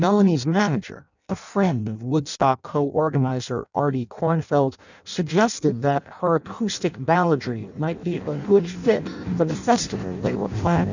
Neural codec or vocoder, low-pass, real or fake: codec, 16 kHz in and 24 kHz out, 0.6 kbps, FireRedTTS-2 codec; 7.2 kHz; fake